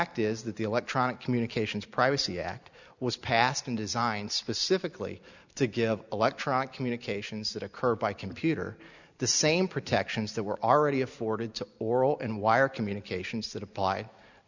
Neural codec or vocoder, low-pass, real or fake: none; 7.2 kHz; real